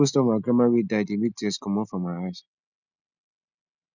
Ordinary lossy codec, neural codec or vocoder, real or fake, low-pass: none; none; real; 7.2 kHz